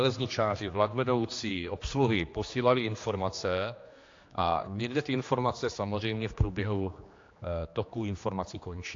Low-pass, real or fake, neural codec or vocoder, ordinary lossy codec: 7.2 kHz; fake; codec, 16 kHz, 2 kbps, X-Codec, HuBERT features, trained on general audio; AAC, 48 kbps